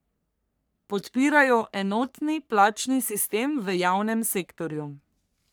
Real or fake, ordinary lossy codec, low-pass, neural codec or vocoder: fake; none; none; codec, 44.1 kHz, 3.4 kbps, Pupu-Codec